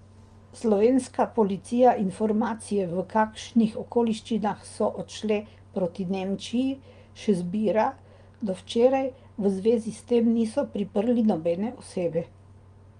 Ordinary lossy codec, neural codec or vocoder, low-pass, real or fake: Opus, 32 kbps; none; 9.9 kHz; real